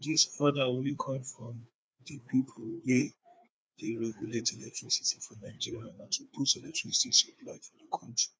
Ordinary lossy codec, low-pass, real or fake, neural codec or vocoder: none; none; fake; codec, 16 kHz, 2 kbps, FreqCodec, larger model